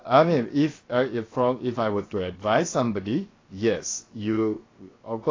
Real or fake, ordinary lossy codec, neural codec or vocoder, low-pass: fake; AAC, 32 kbps; codec, 16 kHz, 0.7 kbps, FocalCodec; 7.2 kHz